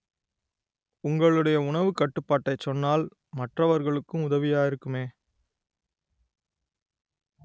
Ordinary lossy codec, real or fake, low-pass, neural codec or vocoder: none; real; none; none